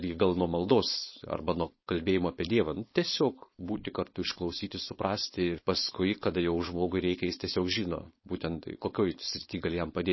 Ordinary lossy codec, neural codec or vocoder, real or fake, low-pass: MP3, 24 kbps; codec, 16 kHz, 4.8 kbps, FACodec; fake; 7.2 kHz